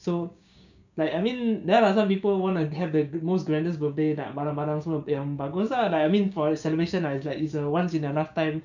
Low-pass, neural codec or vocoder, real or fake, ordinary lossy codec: 7.2 kHz; codec, 16 kHz, 6 kbps, DAC; fake; none